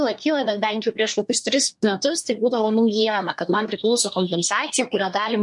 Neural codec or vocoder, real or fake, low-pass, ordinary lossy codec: codec, 24 kHz, 1 kbps, SNAC; fake; 10.8 kHz; MP3, 64 kbps